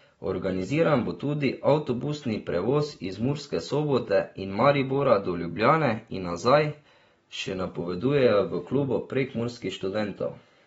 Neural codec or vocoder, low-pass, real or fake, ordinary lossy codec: none; 19.8 kHz; real; AAC, 24 kbps